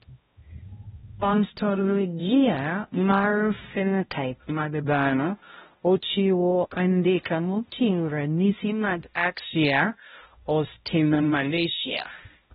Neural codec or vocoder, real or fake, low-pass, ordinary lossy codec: codec, 16 kHz, 0.5 kbps, X-Codec, HuBERT features, trained on balanced general audio; fake; 7.2 kHz; AAC, 16 kbps